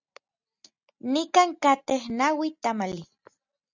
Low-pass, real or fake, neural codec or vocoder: 7.2 kHz; real; none